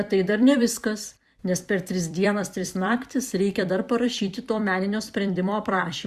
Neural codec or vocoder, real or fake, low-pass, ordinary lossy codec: vocoder, 44.1 kHz, 128 mel bands every 256 samples, BigVGAN v2; fake; 14.4 kHz; Opus, 64 kbps